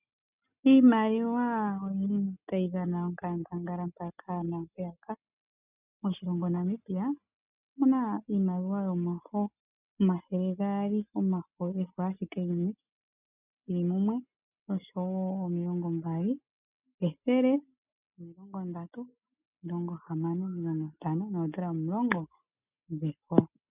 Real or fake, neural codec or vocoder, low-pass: real; none; 3.6 kHz